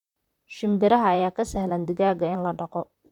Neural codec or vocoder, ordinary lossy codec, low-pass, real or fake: vocoder, 44.1 kHz, 128 mel bands, Pupu-Vocoder; none; 19.8 kHz; fake